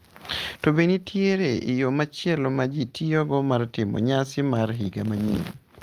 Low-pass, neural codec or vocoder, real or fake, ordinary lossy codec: 14.4 kHz; none; real; Opus, 24 kbps